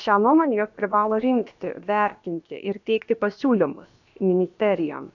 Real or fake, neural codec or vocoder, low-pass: fake; codec, 16 kHz, about 1 kbps, DyCAST, with the encoder's durations; 7.2 kHz